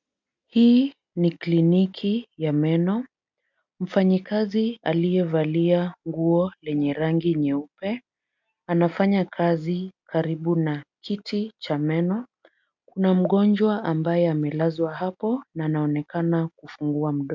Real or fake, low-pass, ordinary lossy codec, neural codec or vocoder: real; 7.2 kHz; MP3, 64 kbps; none